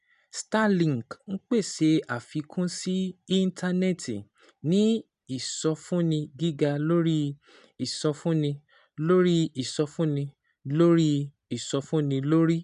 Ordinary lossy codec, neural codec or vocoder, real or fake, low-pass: none; none; real; 10.8 kHz